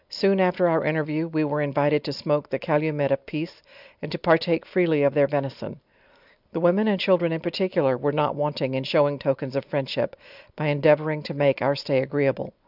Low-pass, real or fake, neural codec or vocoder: 5.4 kHz; real; none